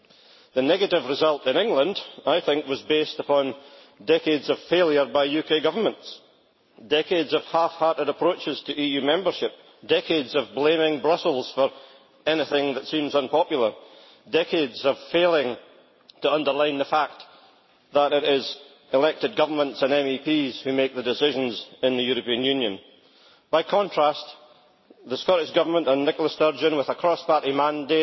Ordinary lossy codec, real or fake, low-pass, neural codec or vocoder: MP3, 24 kbps; real; 7.2 kHz; none